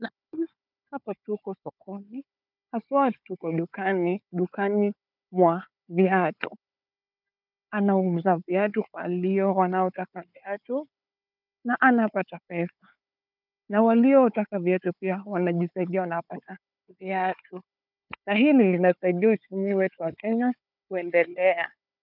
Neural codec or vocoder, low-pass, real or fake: codec, 16 kHz, 4 kbps, FunCodec, trained on Chinese and English, 50 frames a second; 5.4 kHz; fake